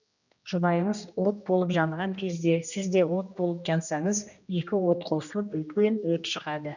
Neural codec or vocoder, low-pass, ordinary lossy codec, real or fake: codec, 16 kHz, 1 kbps, X-Codec, HuBERT features, trained on general audio; 7.2 kHz; none; fake